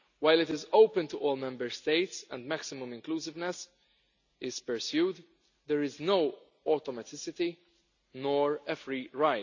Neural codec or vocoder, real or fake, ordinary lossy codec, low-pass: none; real; none; 7.2 kHz